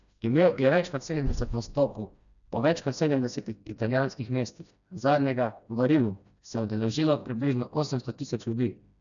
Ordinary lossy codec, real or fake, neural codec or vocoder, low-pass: none; fake; codec, 16 kHz, 1 kbps, FreqCodec, smaller model; 7.2 kHz